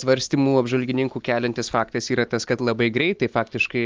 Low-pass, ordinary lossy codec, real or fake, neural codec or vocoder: 7.2 kHz; Opus, 32 kbps; real; none